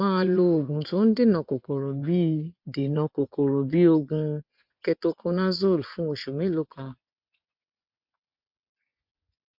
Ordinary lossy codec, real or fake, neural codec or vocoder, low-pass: MP3, 48 kbps; fake; vocoder, 24 kHz, 100 mel bands, Vocos; 5.4 kHz